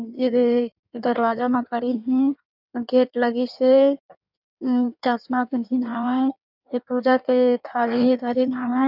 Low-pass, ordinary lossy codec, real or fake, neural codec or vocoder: 5.4 kHz; none; fake; codec, 16 kHz in and 24 kHz out, 1.1 kbps, FireRedTTS-2 codec